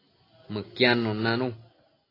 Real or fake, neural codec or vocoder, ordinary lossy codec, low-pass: real; none; AAC, 24 kbps; 5.4 kHz